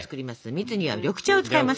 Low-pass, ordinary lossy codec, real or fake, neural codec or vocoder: none; none; real; none